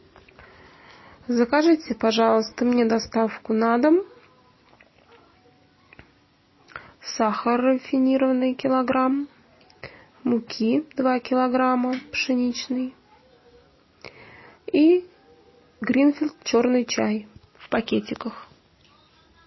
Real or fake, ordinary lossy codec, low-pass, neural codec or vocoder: real; MP3, 24 kbps; 7.2 kHz; none